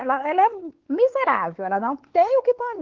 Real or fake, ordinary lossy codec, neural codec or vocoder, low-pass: fake; Opus, 16 kbps; codec, 16 kHz, 4 kbps, X-Codec, WavLM features, trained on Multilingual LibriSpeech; 7.2 kHz